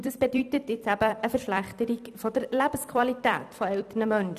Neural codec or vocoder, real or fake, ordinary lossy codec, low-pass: vocoder, 44.1 kHz, 128 mel bands every 256 samples, BigVGAN v2; fake; none; 14.4 kHz